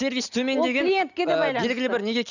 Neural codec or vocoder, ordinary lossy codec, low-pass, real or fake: none; none; 7.2 kHz; real